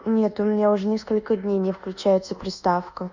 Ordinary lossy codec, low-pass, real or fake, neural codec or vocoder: Opus, 64 kbps; 7.2 kHz; fake; codec, 24 kHz, 1.2 kbps, DualCodec